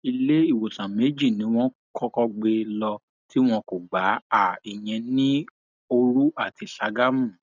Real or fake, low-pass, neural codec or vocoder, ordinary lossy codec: real; 7.2 kHz; none; none